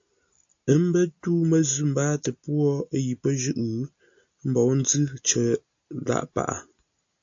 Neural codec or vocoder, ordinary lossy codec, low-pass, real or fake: none; AAC, 64 kbps; 7.2 kHz; real